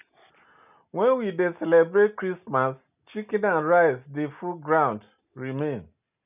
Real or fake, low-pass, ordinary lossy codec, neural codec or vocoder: real; 3.6 kHz; none; none